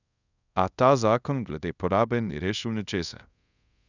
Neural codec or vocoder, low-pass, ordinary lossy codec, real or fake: codec, 24 kHz, 0.5 kbps, DualCodec; 7.2 kHz; none; fake